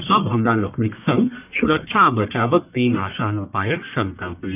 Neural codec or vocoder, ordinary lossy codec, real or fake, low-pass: codec, 44.1 kHz, 1.7 kbps, Pupu-Codec; none; fake; 3.6 kHz